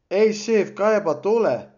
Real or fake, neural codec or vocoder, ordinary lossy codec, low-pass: real; none; none; 7.2 kHz